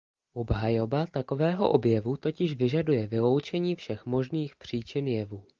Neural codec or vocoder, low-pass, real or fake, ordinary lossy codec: none; 7.2 kHz; real; Opus, 24 kbps